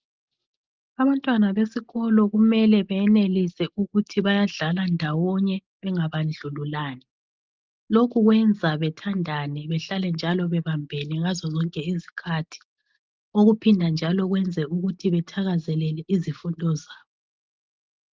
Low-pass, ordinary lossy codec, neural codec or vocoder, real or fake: 7.2 kHz; Opus, 32 kbps; none; real